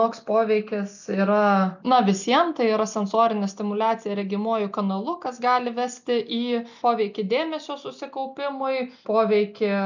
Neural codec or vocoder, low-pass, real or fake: none; 7.2 kHz; real